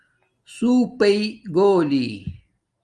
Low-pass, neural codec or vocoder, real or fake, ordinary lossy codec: 10.8 kHz; none; real; Opus, 32 kbps